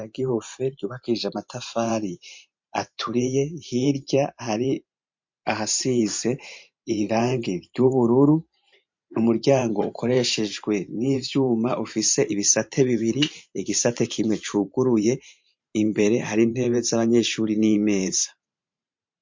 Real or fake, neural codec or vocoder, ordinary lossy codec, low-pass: fake; vocoder, 44.1 kHz, 128 mel bands every 512 samples, BigVGAN v2; MP3, 48 kbps; 7.2 kHz